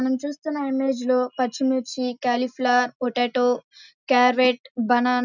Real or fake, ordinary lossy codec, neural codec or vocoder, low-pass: real; none; none; 7.2 kHz